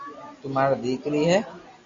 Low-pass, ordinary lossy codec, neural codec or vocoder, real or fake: 7.2 kHz; AAC, 32 kbps; none; real